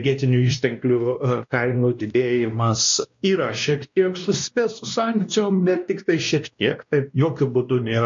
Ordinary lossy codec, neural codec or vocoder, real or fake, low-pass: MP3, 48 kbps; codec, 16 kHz, 1 kbps, X-Codec, WavLM features, trained on Multilingual LibriSpeech; fake; 7.2 kHz